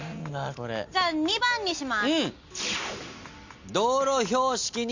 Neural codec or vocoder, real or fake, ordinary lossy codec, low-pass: none; real; Opus, 64 kbps; 7.2 kHz